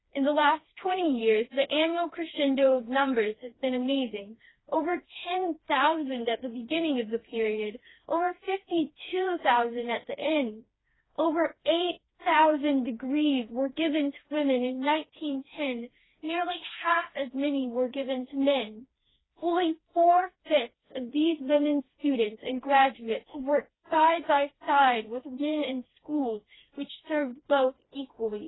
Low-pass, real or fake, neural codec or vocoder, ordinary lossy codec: 7.2 kHz; fake; codec, 16 kHz, 2 kbps, FreqCodec, smaller model; AAC, 16 kbps